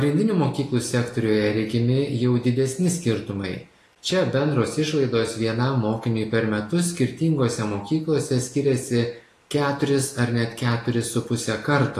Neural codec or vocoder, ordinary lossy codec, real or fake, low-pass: none; AAC, 48 kbps; real; 14.4 kHz